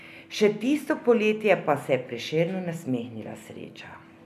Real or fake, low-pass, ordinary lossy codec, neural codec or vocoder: real; 14.4 kHz; none; none